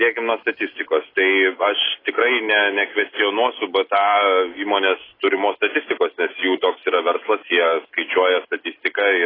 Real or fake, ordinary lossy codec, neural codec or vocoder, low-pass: real; AAC, 24 kbps; none; 5.4 kHz